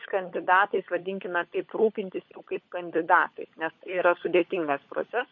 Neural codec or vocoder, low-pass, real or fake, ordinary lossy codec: codec, 16 kHz, 16 kbps, FunCodec, trained on LibriTTS, 50 frames a second; 7.2 kHz; fake; MP3, 32 kbps